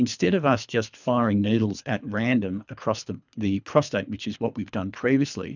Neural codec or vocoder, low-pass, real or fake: codec, 24 kHz, 3 kbps, HILCodec; 7.2 kHz; fake